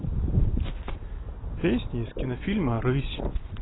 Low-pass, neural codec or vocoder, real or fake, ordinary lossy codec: 7.2 kHz; none; real; AAC, 16 kbps